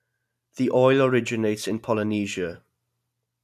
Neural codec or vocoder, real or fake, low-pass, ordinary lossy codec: none; real; 14.4 kHz; none